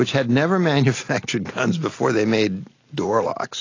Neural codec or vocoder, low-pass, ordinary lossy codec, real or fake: none; 7.2 kHz; AAC, 32 kbps; real